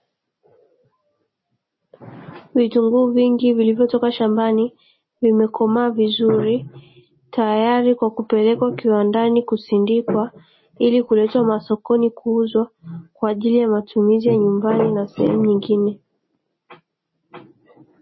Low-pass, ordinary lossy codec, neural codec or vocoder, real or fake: 7.2 kHz; MP3, 24 kbps; none; real